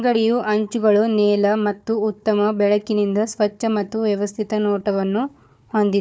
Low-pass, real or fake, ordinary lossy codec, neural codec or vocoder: none; fake; none; codec, 16 kHz, 4 kbps, FunCodec, trained on Chinese and English, 50 frames a second